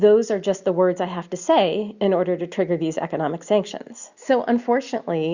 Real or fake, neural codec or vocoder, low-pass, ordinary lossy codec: real; none; 7.2 kHz; Opus, 64 kbps